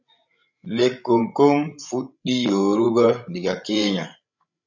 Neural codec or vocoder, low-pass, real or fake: codec, 16 kHz, 16 kbps, FreqCodec, larger model; 7.2 kHz; fake